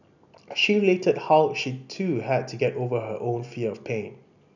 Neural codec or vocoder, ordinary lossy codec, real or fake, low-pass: none; none; real; 7.2 kHz